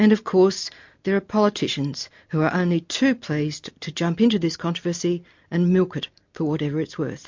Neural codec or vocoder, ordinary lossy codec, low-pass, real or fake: none; MP3, 48 kbps; 7.2 kHz; real